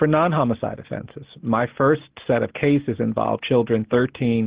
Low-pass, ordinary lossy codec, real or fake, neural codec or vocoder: 3.6 kHz; Opus, 16 kbps; real; none